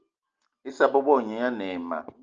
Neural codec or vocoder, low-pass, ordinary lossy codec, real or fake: none; 7.2 kHz; Opus, 24 kbps; real